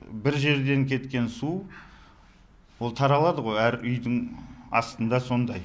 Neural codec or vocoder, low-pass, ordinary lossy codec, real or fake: none; none; none; real